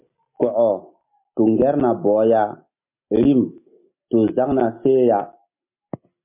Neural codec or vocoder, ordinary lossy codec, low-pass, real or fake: none; MP3, 24 kbps; 3.6 kHz; real